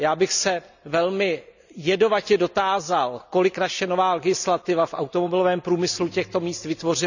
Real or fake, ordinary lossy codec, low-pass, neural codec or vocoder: real; none; 7.2 kHz; none